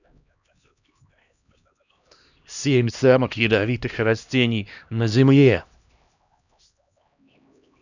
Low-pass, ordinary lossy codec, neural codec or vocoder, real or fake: 7.2 kHz; none; codec, 16 kHz, 1 kbps, X-Codec, HuBERT features, trained on LibriSpeech; fake